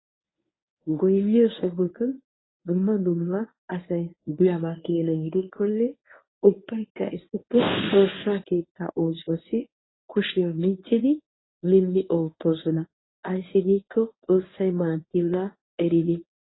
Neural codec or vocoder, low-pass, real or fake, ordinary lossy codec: codec, 24 kHz, 0.9 kbps, WavTokenizer, medium speech release version 1; 7.2 kHz; fake; AAC, 16 kbps